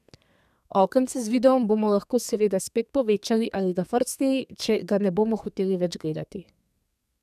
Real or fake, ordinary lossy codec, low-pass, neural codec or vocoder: fake; none; 14.4 kHz; codec, 32 kHz, 1.9 kbps, SNAC